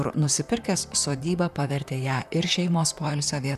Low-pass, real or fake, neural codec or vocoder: 14.4 kHz; fake; vocoder, 44.1 kHz, 128 mel bands, Pupu-Vocoder